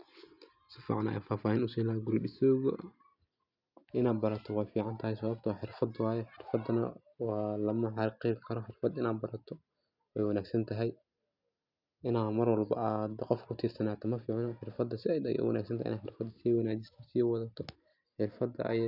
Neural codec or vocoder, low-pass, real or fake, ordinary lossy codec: none; 5.4 kHz; real; none